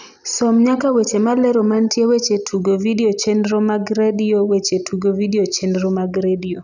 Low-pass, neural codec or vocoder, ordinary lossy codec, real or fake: 7.2 kHz; none; none; real